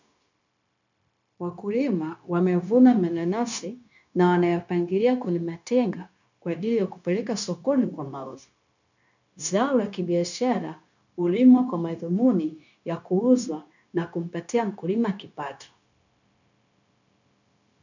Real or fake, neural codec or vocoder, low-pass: fake; codec, 16 kHz, 0.9 kbps, LongCat-Audio-Codec; 7.2 kHz